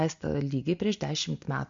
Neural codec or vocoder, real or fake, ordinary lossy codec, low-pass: none; real; MP3, 48 kbps; 7.2 kHz